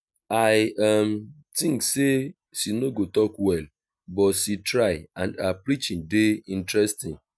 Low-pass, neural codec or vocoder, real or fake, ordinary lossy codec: none; none; real; none